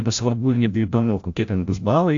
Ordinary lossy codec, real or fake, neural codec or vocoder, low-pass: AAC, 48 kbps; fake; codec, 16 kHz, 0.5 kbps, FreqCodec, larger model; 7.2 kHz